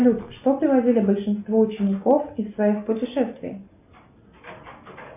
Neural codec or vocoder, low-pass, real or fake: none; 3.6 kHz; real